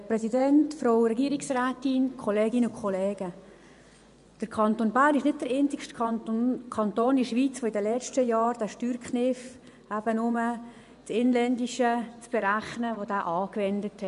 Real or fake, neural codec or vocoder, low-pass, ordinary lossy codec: fake; vocoder, 24 kHz, 100 mel bands, Vocos; 10.8 kHz; none